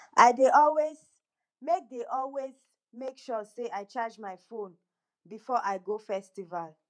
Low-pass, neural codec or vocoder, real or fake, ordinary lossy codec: 9.9 kHz; vocoder, 48 kHz, 128 mel bands, Vocos; fake; none